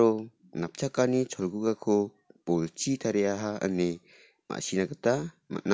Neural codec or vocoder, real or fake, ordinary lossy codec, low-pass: none; real; none; none